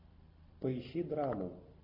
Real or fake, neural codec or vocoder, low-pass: real; none; 5.4 kHz